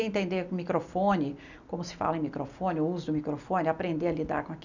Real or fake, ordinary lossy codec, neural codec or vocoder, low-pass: real; none; none; 7.2 kHz